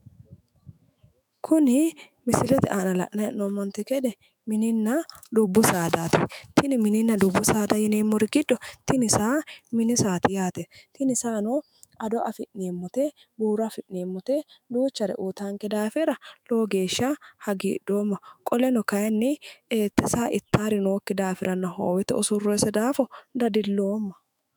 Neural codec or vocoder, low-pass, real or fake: autoencoder, 48 kHz, 128 numbers a frame, DAC-VAE, trained on Japanese speech; 19.8 kHz; fake